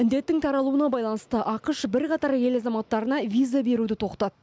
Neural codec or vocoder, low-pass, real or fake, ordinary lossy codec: none; none; real; none